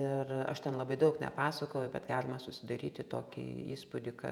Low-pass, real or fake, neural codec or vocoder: 19.8 kHz; fake; vocoder, 48 kHz, 128 mel bands, Vocos